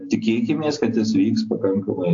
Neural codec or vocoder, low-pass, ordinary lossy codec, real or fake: none; 7.2 kHz; AAC, 48 kbps; real